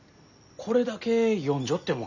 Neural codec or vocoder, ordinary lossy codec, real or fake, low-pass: none; none; real; 7.2 kHz